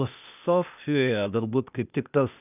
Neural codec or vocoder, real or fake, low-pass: codec, 16 kHz, 0.8 kbps, ZipCodec; fake; 3.6 kHz